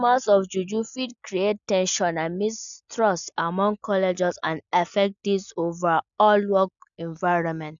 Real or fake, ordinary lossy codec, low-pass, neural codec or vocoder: real; none; 7.2 kHz; none